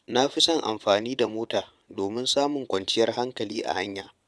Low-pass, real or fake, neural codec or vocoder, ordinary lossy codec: none; fake; vocoder, 22.05 kHz, 80 mel bands, Vocos; none